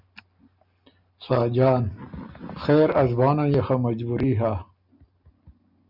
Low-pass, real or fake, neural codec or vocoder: 5.4 kHz; real; none